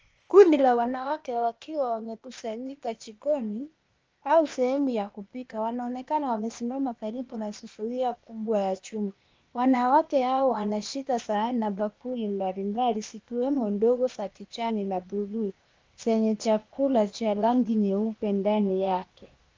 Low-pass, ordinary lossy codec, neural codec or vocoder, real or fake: 7.2 kHz; Opus, 24 kbps; codec, 16 kHz, 0.8 kbps, ZipCodec; fake